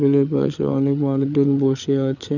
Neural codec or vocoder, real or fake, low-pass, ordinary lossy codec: codec, 16 kHz, 8 kbps, FreqCodec, larger model; fake; 7.2 kHz; none